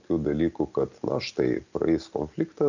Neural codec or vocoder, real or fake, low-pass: none; real; 7.2 kHz